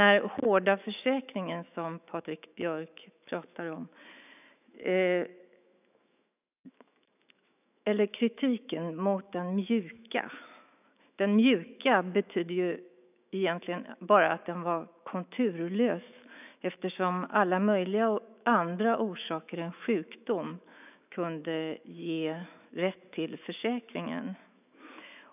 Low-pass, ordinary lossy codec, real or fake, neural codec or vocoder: 3.6 kHz; none; fake; autoencoder, 48 kHz, 128 numbers a frame, DAC-VAE, trained on Japanese speech